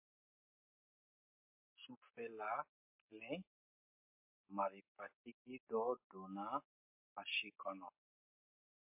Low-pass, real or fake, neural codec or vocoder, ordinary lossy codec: 3.6 kHz; real; none; MP3, 24 kbps